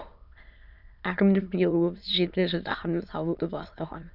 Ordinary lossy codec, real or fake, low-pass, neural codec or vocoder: none; fake; 5.4 kHz; autoencoder, 22.05 kHz, a latent of 192 numbers a frame, VITS, trained on many speakers